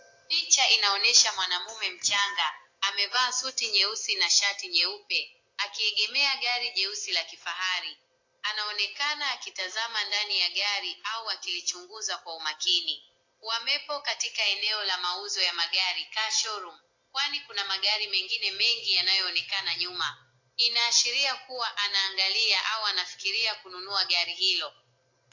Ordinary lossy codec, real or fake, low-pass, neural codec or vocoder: AAC, 48 kbps; real; 7.2 kHz; none